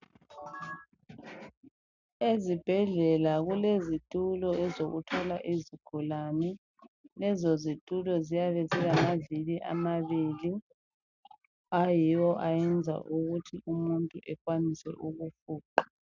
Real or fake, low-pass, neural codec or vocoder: real; 7.2 kHz; none